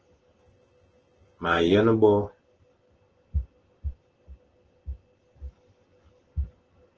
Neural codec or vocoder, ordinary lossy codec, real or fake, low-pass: none; Opus, 16 kbps; real; 7.2 kHz